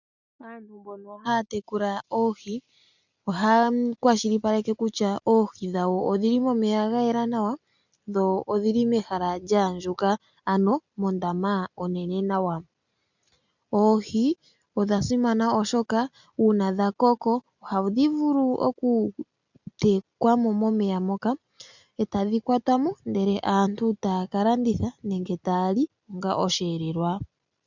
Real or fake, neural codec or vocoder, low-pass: real; none; 7.2 kHz